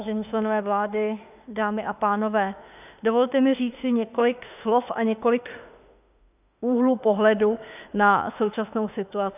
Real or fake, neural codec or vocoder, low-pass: fake; autoencoder, 48 kHz, 32 numbers a frame, DAC-VAE, trained on Japanese speech; 3.6 kHz